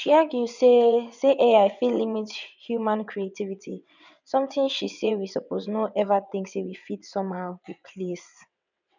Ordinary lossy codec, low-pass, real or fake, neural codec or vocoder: none; 7.2 kHz; fake; vocoder, 22.05 kHz, 80 mel bands, WaveNeXt